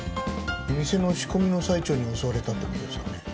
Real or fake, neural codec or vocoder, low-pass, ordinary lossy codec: real; none; none; none